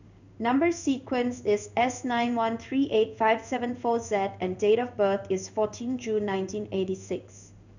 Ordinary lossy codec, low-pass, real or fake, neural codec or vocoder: none; 7.2 kHz; fake; codec, 16 kHz in and 24 kHz out, 1 kbps, XY-Tokenizer